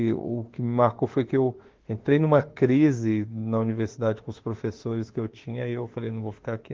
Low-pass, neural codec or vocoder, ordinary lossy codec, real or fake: 7.2 kHz; autoencoder, 48 kHz, 32 numbers a frame, DAC-VAE, trained on Japanese speech; Opus, 16 kbps; fake